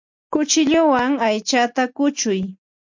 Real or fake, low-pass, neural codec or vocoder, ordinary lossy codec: real; 7.2 kHz; none; MP3, 48 kbps